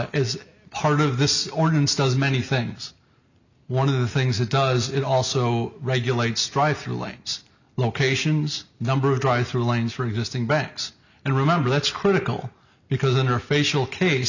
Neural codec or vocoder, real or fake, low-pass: none; real; 7.2 kHz